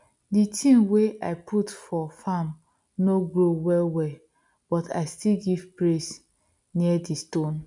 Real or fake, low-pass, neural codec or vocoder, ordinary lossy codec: real; 10.8 kHz; none; none